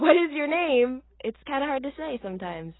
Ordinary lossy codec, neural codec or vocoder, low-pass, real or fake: AAC, 16 kbps; none; 7.2 kHz; real